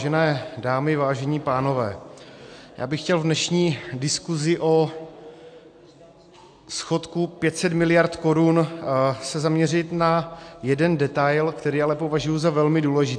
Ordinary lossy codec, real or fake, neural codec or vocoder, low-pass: AAC, 64 kbps; real; none; 9.9 kHz